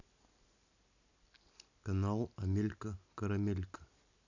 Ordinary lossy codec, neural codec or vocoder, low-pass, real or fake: none; none; 7.2 kHz; real